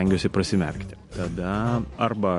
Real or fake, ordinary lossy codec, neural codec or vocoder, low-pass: real; MP3, 48 kbps; none; 14.4 kHz